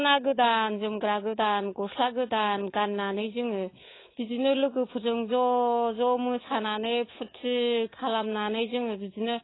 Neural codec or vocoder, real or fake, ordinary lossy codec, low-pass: codec, 24 kHz, 3.1 kbps, DualCodec; fake; AAC, 16 kbps; 7.2 kHz